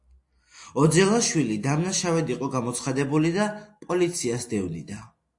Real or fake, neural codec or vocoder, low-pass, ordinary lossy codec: real; none; 10.8 kHz; AAC, 64 kbps